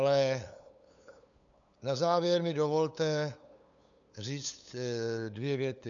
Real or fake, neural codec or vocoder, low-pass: fake; codec, 16 kHz, 8 kbps, FunCodec, trained on LibriTTS, 25 frames a second; 7.2 kHz